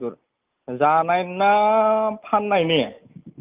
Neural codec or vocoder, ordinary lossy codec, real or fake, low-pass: none; Opus, 24 kbps; real; 3.6 kHz